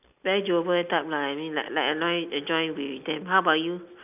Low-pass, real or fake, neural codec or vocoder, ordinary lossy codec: 3.6 kHz; real; none; none